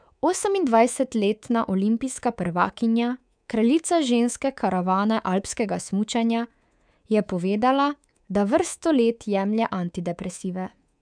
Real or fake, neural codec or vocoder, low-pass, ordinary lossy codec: fake; codec, 24 kHz, 3.1 kbps, DualCodec; 9.9 kHz; none